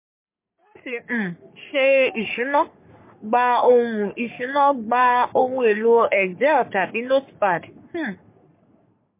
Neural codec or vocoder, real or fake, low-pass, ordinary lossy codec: codec, 44.1 kHz, 3.4 kbps, Pupu-Codec; fake; 3.6 kHz; MP3, 24 kbps